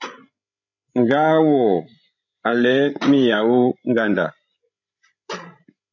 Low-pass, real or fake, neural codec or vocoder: 7.2 kHz; fake; codec, 16 kHz, 16 kbps, FreqCodec, larger model